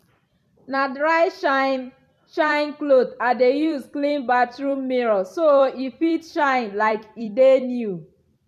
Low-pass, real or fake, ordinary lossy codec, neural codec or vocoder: 14.4 kHz; fake; none; vocoder, 44.1 kHz, 128 mel bands every 256 samples, BigVGAN v2